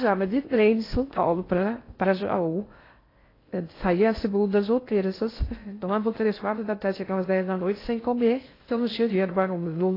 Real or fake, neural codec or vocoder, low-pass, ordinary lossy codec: fake; codec, 16 kHz in and 24 kHz out, 0.6 kbps, FocalCodec, streaming, 2048 codes; 5.4 kHz; AAC, 24 kbps